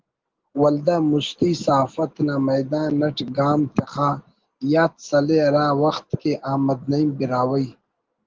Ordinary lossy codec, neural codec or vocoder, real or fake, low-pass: Opus, 16 kbps; none; real; 7.2 kHz